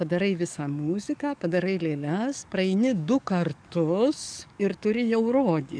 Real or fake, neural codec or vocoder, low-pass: fake; codec, 44.1 kHz, 7.8 kbps, DAC; 9.9 kHz